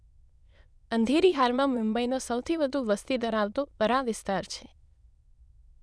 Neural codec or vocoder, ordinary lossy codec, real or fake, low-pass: autoencoder, 22.05 kHz, a latent of 192 numbers a frame, VITS, trained on many speakers; none; fake; none